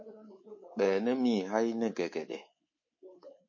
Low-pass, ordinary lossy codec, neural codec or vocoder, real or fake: 7.2 kHz; MP3, 32 kbps; codec, 24 kHz, 3.1 kbps, DualCodec; fake